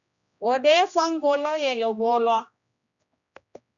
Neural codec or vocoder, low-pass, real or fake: codec, 16 kHz, 1 kbps, X-Codec, HuBERT features, trained on general audio; 7.2 kHz; fake